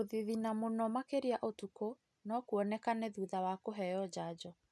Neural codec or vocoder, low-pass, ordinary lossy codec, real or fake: none; none; none; real